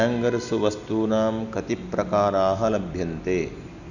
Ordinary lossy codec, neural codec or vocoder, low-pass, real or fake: none; none; 7.2 kHz; real